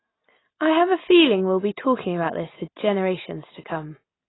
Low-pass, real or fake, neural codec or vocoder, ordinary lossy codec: 7.2 kHz; real; none; AAC, 16 kbps